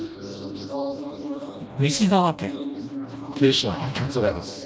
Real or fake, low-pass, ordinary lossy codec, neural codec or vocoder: fake; none; none; codec, 16 kHz, 1 kbps, FreqCodec, smaller model